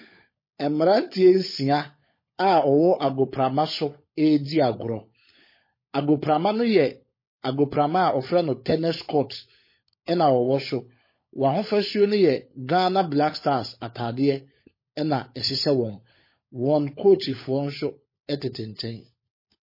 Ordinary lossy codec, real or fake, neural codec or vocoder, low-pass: MP3, 24 kbps; fake; codec, 16 kHz, 16 kbps, FunCodec, trained on LibriTTS, 50 frames a second; 5.4 kHz